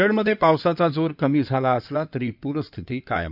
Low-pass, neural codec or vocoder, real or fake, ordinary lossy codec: 5.4 kHz; codec, 16 kHz in and 24 kHz out, 2.2 kbps, FireRedTTS-2 codec; fake; none